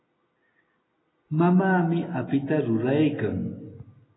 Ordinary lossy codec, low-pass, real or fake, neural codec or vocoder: AAC, 16 kbps; 7.2 kHz; real; none